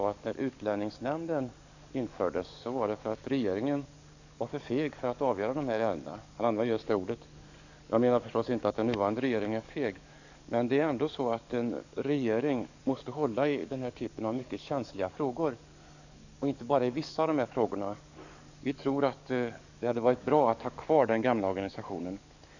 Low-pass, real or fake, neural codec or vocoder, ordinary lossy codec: 7.2 kHz; fake; codec, 44.1 kHz, 7.8 kbps, DAC; none